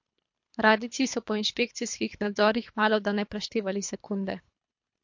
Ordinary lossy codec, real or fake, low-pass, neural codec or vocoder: MP3, 48 kbps; fake; 7.2 kHz; codec, 24 kHz, 3 kbps, HILCodec